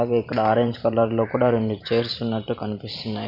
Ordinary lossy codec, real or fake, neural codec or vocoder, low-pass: none; fake; vocoder, 44.1 kHz, 128 mel bands every 512 samples, BigVGAN v2; 5.4 kHz